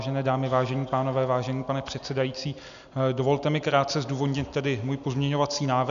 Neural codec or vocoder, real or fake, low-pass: none; real; 7.2 kHz